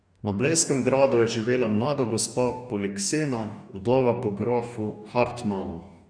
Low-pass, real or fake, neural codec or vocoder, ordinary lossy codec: 9.9 kHz; fake; codec, 44.1 kHz, 2.6 kbps, DAC; none